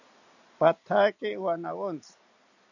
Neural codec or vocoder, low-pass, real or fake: none; 7.2 kHz; real